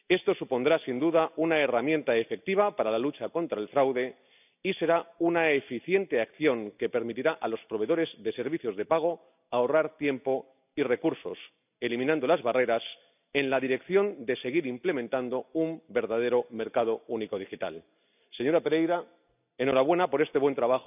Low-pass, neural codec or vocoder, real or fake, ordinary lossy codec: 3.6 kHz; none; real; none